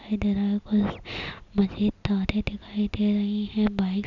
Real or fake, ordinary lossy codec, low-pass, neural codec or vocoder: real; none; 7.2 kHz; none